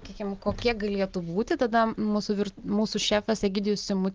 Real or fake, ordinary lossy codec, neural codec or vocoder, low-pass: real; Opus, 32 kbps; none; 7.2 kHz